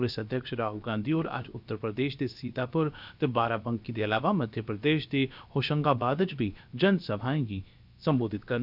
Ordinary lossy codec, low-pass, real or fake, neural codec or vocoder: none; 5.4 kHz; fake; codec, 16 kHz, about 1 kbps, DyCAST, with the encoder's durations